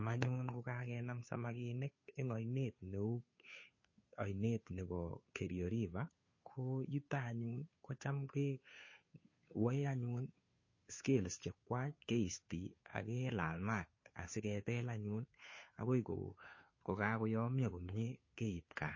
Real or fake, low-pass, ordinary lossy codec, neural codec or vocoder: fake; 7.2 kHz; MP3, 32 kbps; codec, 16 kHz, 2 kbps, FunCodec, trained on Chinese and English, 25 frames a second